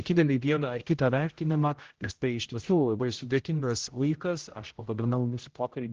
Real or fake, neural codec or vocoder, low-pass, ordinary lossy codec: fake; codec, 16 kHz, 0.5 kbps, X-Codec, HuBERT features, trained on general audio; 7.2 kHz; Opus, 16 kbps